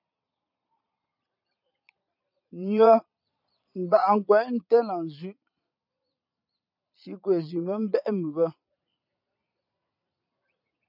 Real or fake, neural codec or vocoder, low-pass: fake; vocoder, 22.05 kHz, 80 mel bands, Vocos; 5.4 kHz